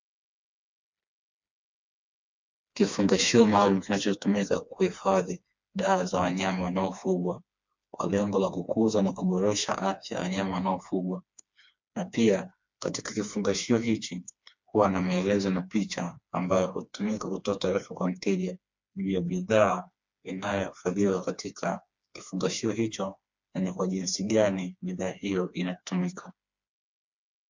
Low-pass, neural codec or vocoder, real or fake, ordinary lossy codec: 7.2 kHz; codec, 16 kHz, 2 kbps, FreqCodec, smaller model; fake; MP3, 64 kbps